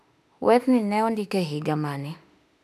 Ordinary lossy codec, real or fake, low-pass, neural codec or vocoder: none; fake; 14.4 kHz; autoencoder, 48 kHz, 32 numbers a frame, DAC-VAE, trained on Japanese speech